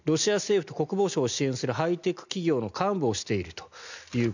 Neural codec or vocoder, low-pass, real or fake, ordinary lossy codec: none; 7.2 kHz; real; none